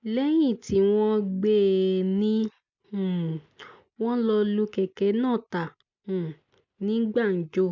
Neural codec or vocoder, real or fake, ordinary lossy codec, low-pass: none; real; MP3, 64 kbps; 7.2 kHz